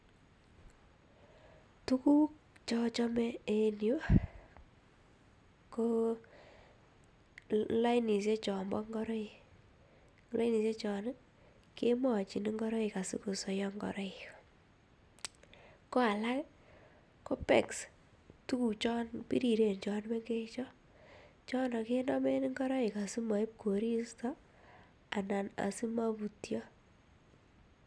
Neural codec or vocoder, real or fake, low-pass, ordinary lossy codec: none; real; none; none